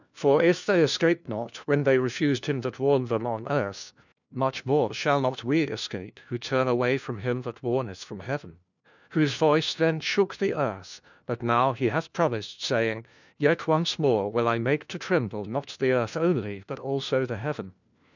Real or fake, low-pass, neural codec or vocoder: fake; 7.2 kHz; codec, 16 kHz, 1 kbps, FunCodec, trained on LibriTTS, 50 frames a second